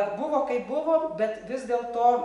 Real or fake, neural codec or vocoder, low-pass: real; none; 10.8 kHz